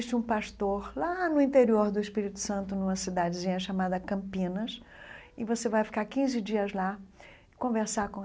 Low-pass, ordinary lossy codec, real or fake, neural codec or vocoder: none; none; real; none